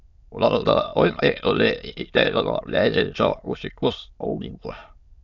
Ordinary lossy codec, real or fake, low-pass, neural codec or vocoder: AAC, 48 kbps; fake; 7.2 kHz; autoencoder, 22.05 kHz, a latent of 192 numbers a frame, VITS, trained on many speakers